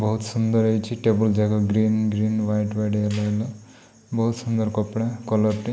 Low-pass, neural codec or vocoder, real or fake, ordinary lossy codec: none; none; real; none